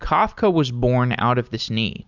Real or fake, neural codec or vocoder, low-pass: real; none; 7.2 kHz